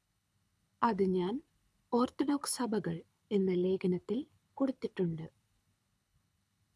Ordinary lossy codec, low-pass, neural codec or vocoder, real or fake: none; none; codec, 24 kHz, 6 kbps, HILCodec; fake